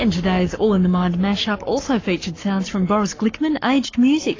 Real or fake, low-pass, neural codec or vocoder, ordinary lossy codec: fake; 7.2 kHz; codec, 44.1 kHz, 7.8 kbps, Pupu-Codec; AAC, 32 kbps